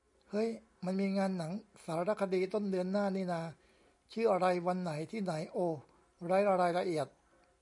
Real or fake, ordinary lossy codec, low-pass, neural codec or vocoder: real; MP3, 96 kbps; 10.8 kHz; none